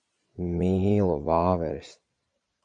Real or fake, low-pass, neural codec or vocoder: fake; 9.9 kHz; vocoder, 22.05 kHz, 80 mel bands, Vocos